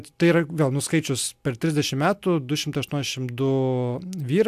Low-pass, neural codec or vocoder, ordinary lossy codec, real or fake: 14.4 kHz; none; AAC, 96 kbps; real